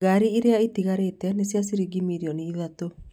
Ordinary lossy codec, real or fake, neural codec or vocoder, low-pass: none; real; none; 19.8 kHz